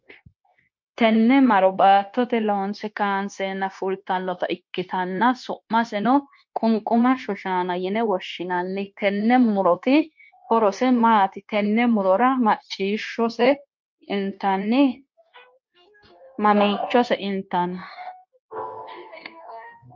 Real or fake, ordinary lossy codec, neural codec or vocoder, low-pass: fake; MP3, 48 kbps; codec, 16 kHz, 0.9 kbps, LongCat-Audio-Codec; 7.2 kHz